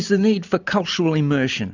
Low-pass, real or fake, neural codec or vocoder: 7.2 kHz; real; none